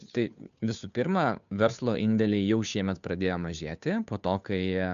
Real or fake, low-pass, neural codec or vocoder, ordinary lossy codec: fake; 7.2 kHz; codec, 16 kHz, 2 kbps, FunCodec, trained on Chinese and English, 25 frames a second; MP3, 96 kbps